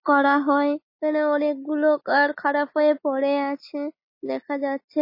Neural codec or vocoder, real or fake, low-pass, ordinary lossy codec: none; real; 5.4 kHz; MP3, 24 kbps